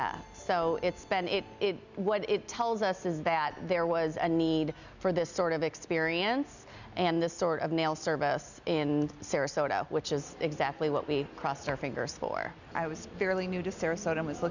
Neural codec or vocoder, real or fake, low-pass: none; real; 7.2 kHz